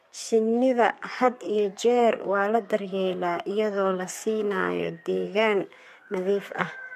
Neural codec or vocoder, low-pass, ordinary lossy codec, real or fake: codec, 32 kHz, 1.9 kbps, SNAC; 14.4 kHz; MP3, 64 kbps; fake